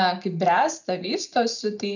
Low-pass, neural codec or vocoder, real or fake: 7.2 kHz; none; real